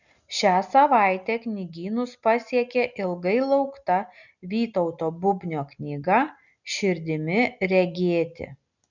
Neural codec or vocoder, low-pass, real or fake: none; 7.2 kHz; real